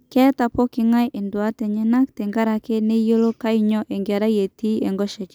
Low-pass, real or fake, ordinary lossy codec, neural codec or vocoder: none; real; none; none